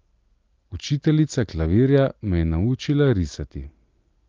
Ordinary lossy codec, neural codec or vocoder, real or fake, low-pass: Opus, 32 kbps; none; real; 7.2 kHz